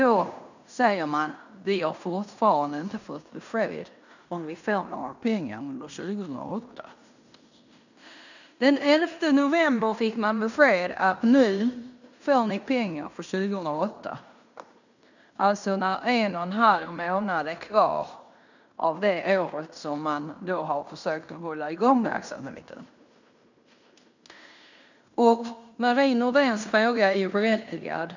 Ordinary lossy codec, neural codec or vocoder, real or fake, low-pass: none; codec, 16 kHz in and 24 kHz out, 0.9 kbps, LongCat-Audio-Codec, fine tuned four codebook decoder; fake; 7.2 kHz